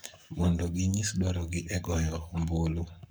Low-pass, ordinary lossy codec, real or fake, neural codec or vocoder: none; none; fake; codec, 44.1 kHz, 7.8 kbps, Pupu-Codec